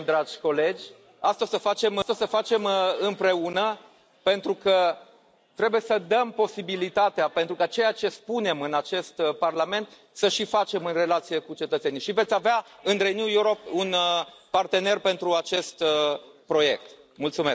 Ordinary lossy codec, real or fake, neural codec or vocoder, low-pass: none; real; none; none